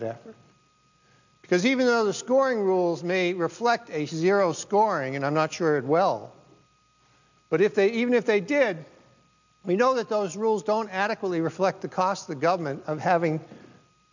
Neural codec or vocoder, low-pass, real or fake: none; 7.2 kHz; real